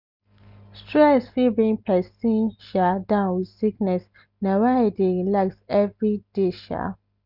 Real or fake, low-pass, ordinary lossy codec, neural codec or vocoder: real; 5.4 kHz; none; none